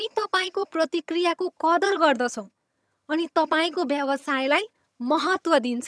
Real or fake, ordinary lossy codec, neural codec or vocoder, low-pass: fake; none; vocoder, 22.05 kHz, 80 mel bands, HiFi-GAN; none